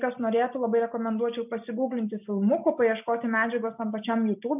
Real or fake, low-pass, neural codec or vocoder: real; 3.6 kHz; none